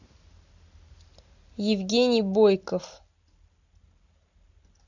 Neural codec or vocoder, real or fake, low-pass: none; real; 7.2 kHz